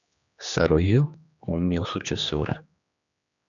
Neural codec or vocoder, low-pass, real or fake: codec, 16 kHz, 2 kbps, X-Codec, HuBERT features, trained on general audio; 7.2 kHz; fake